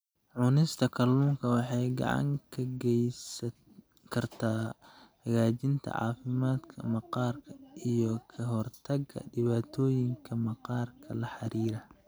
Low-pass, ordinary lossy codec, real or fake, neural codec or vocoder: none; none; real; none